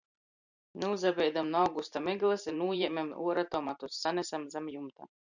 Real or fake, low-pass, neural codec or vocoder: fake; 7.2 kHz; vocoder, 44.1 kHz, 128 mel bands every 512 samples, BigVGAN v2